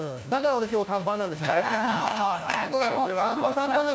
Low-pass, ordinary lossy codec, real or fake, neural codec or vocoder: none; none; fake; codec, 16 kHz, 1 kbps, FunCodec, trained on LibriTTS, 50 frames a second